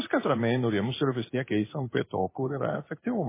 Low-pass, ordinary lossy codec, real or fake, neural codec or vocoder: 3.6 kHz; MP3, 16 kbps; fake; codec, 16 kHz in and 24 kHz out, 1 kbps, XY-Tokenizer